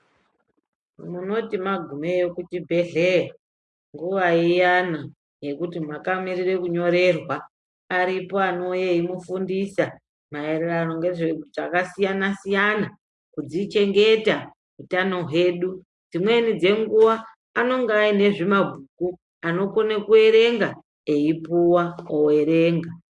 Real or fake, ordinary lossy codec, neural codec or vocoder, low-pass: real; MP3, 64 kbps; none; 10.8 kHz